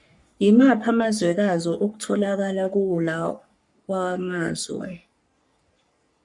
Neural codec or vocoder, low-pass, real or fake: codec, 44.1 kHz, 3.4 kbps, Pupu-Codec; 10.8 kHz; fake